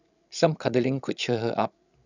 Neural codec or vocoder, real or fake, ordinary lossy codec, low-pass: codec, 16 kHz, 16 kbps, FreqCodec, larger model; fake; none; 7.2 kHz